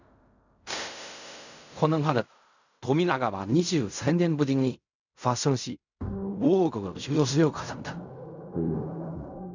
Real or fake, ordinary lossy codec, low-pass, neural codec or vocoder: fake; none; 7.2 kHz; codec, 16 kHz in and 24 kHz out, 0.4 kbps, LongCat-Audio-Codec, fine tuned four codebook decoder